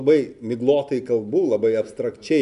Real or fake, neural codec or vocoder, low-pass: real; none; 10.8 kHz